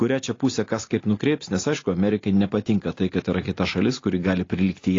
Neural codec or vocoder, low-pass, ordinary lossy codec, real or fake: none; 7.2 kHz; AAC, 32 kbps; real